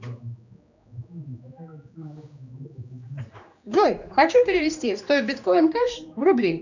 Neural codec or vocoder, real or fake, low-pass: codec, 16 kHz, 2 kbps, X-Codec, HuBERT features, trained on general audio; fake; 7.2 kHz